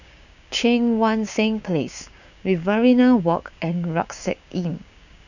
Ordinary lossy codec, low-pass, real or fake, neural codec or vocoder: none; 7.2 kHz; fake; codec, 16 kHz, 6 kbps, DAC